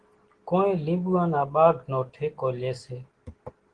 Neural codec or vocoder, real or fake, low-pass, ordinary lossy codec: none; real; 9.9 kHz; Opus, 16 kbps